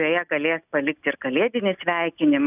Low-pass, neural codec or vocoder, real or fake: 3.6 kHz; none; real